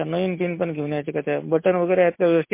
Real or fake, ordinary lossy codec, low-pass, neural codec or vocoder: real; MP3, 24 kbps; 3.6 kHz; none